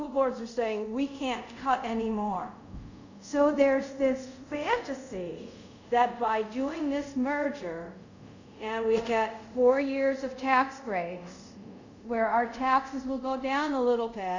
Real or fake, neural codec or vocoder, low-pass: fake; codec, 24 kHz, 0.5 kbps, DualCodec; 7.2 kHz